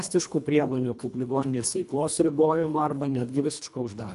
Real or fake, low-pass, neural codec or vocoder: fake; 10.8 kHz; codec, 24 kHz, 1.5 kbps, HILCodec